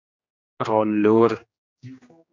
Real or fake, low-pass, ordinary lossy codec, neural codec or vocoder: fake; 7.2 kHz; MP3, 64 kbps; codec, 16 kHz, 2 kbps, X-Codec, HuBERT features, trained on general audio